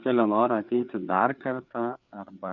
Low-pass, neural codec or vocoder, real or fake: 7.2 kHz; codec, 16 kHz, 4 kbps, FreqCodec, larger model; fake